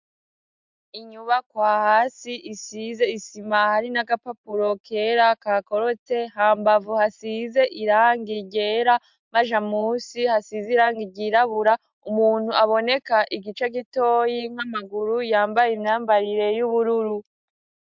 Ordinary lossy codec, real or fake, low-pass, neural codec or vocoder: MP3, 64 kbps; real; 7.2 kHz; none